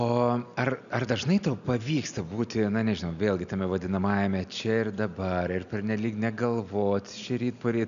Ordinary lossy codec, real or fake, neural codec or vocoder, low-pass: MP3, 96 kbps; real; none; 7.2 kHz